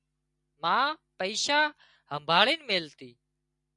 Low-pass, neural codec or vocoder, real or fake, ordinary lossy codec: 10.8 kHz; none; real; MP3, 96 kbps